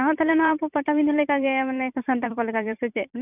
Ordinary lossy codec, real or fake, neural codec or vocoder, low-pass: none; fake; vocoder, 44.1 kHz, 128 mel bands every 256 samples, BigVGAN v2; 3.6 kHz